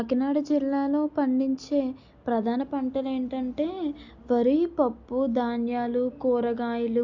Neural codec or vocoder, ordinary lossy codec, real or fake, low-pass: none; AAC, 48 kbps; real; 7.2 kHz